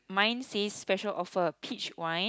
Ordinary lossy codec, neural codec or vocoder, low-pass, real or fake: none; none; none; real